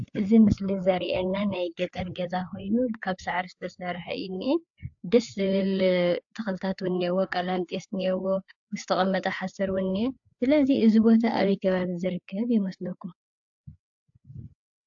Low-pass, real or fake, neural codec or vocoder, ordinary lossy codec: 7.2 kHz; fake; codec, 16 kHz, 4 kbps, FreqCodec, larger model; AAC, 64 kbps